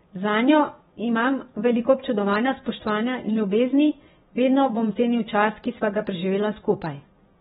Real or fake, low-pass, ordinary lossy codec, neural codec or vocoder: fake; 10.8 kHz; AAC, 16 kbps; vocoder, 24 kHz, 100 mel bands, Vocos